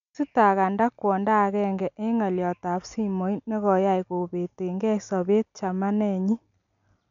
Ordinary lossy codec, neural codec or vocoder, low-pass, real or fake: none; none; 7.2 kHz; real